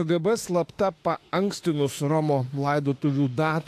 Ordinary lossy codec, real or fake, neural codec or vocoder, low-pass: AAC, 64 kbps; fake; autoencoder, 48 kHz, 32 numbers a frame, DAC-VAE, trained on Japanese speech; 14.4 kHz